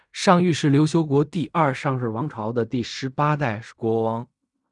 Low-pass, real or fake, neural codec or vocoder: 10.8 kHz; fake; codec, 16 kHz in and 24 kHz out, 0.4 kbps, LongCat-Audio-Codec, fine tuned four codebook decoder